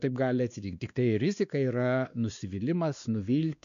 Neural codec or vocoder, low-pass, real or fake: codec, 16 kHz, 2 kbps, X-Codec, WavLM features, trained on Multilingual LibriSpeech; 7.2 kHz; fake